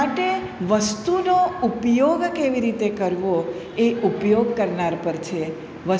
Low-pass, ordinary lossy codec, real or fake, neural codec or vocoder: none; none; real; none